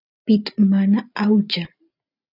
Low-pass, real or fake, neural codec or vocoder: 5.4 kHz; real; none